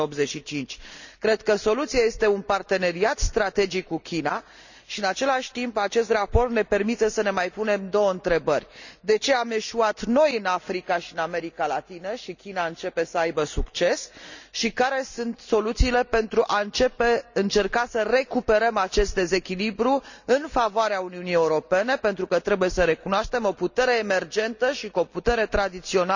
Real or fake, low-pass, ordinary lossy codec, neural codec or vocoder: real; 7.2 kHz; none; none